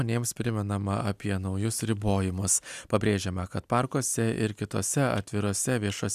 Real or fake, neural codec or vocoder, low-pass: real; none; 14.4 kHz